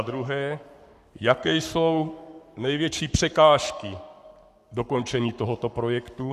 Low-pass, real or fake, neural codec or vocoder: 14.4 kHz; fake; codec, 44.1 kHz, 7.8 kbps, Pupu-Codec